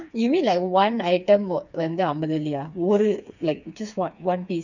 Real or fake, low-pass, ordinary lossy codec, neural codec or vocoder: fake; 7.2 kHz; none; codec, 16 kHz, 4 kbps, FreqCodec, smaller model